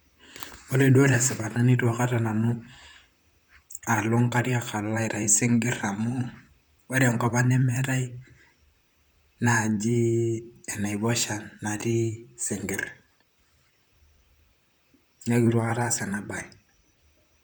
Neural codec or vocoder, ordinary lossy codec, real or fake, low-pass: vocoder, 44.1 kHz, 128 mel bands, Pupu-Vocoder; none; fake; none